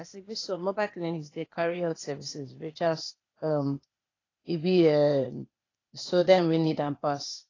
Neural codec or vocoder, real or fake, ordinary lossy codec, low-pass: codec, 16 kHz, 0.8 kbps, ZipCodec; fake; AAC, 32 kbps; 7.2 kHz